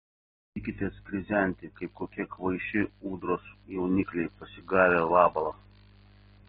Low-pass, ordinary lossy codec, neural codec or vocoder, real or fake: 7.2 kHz; AAC, 16 kbps; none; real